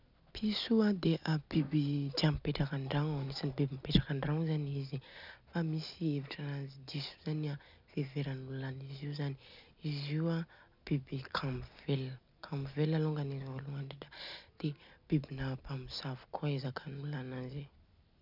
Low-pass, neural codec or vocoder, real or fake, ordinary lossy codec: 5.4 kHz; none; real; none